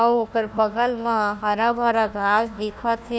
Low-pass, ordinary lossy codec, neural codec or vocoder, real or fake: none; none; codec, 16 kHz, 1 kbps, FunCodec, trained on Chinese and English, 50 frames a second; fake